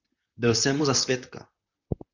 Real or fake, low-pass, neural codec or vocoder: fake; 7.2 kHz; vocoder, 44.1 kHz, 128 mel bands, Pupu-Vocoder